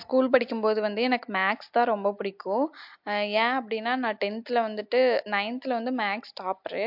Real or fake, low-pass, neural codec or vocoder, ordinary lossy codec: real; 5.4 kHz; none; MP3, 48 kbps